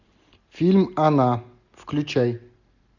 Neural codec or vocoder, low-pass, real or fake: none; 7.2 kHz; real